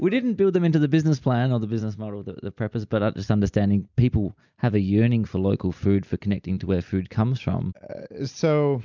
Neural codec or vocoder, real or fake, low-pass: none; real; 7.2 kHz